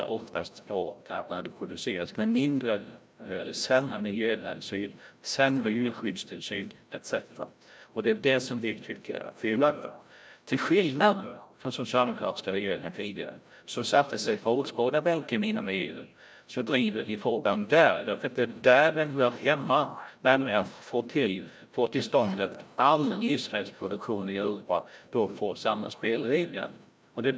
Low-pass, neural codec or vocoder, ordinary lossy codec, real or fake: none; codec, 16 kHz, 0.5 kbps, FreqCodec, larger model; none; fake